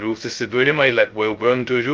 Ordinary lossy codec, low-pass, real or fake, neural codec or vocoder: Opus, 32 kbps; 7.2 kHz; fake; codec, 16 kHz, 0.2 kbps, FocalCodec